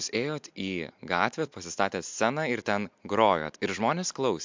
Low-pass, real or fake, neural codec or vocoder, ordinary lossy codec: 7.2 kHz; real; none; MP3, 64 kbps